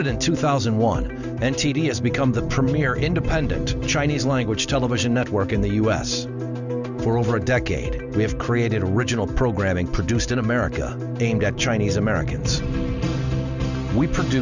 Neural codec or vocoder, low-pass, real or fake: none; 7.2 kHz; real